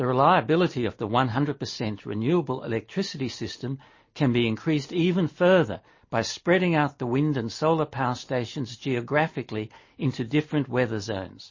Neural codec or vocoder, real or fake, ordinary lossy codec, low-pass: none; real; MP3, 32 kbps; 7.2 kHz